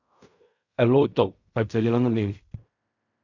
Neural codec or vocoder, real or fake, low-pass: codec, 16 kHz in and 24 kHz out, 0.4 kbps, LongCat-Audio-Codec, fine tuned four codebook decoder; fake; 7.2 kHz